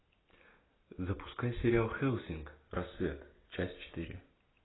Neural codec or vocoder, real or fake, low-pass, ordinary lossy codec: none; real; 7.2 kHz; AAC, 16 kbps